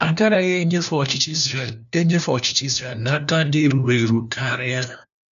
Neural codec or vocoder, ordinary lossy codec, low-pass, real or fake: codec, 16 kHz, 1 kbps, FunCodec, trained on LibriTTS, 50 frames a second; none; 7.2 kHz; fake